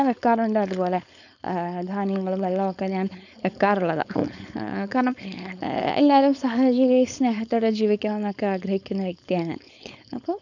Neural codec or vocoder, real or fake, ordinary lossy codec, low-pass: codec, 16 kHz, 4.8 kbps, FACodec; fake; none; 7.2 kHz